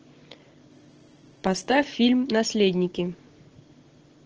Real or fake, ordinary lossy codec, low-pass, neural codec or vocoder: real; Opus, 16 kbps; 7.2 kHz; none